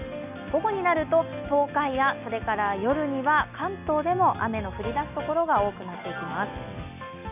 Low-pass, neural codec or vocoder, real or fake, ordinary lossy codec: 3.6 kHz; none; real; none